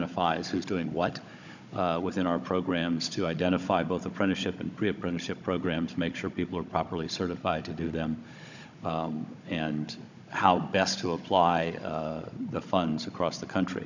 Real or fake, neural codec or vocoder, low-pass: fake; codec, 16 kHz, 16 kbps, FunCodec, trained on Chinese and English, 50 frames a second; 7.2 kHz